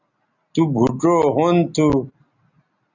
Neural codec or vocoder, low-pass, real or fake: none; 7.2 kHz; real